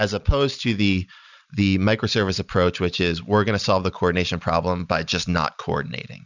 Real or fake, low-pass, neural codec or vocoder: real; 7.2 kHz; none